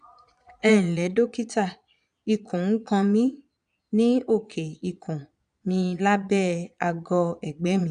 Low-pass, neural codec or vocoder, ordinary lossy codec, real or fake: 9.9 kHz; vocoder, 22.05 kHz, 80 mel bands, Vocos; none; fake